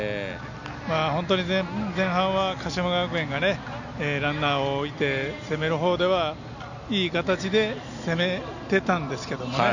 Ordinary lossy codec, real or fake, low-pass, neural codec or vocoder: none; real; 7.2 kHz; none